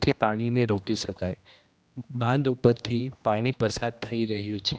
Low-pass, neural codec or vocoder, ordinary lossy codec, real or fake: none; codec, 16 kHz, 1 kbps, X-Codec, HuBERT features, trained on general audio; none; fake